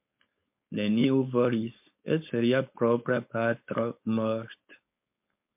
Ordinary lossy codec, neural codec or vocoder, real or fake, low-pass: MP3, 32 kbps; codec, 16 kHz, 4.8 kbps, FACodec; fake; 3.6 kHz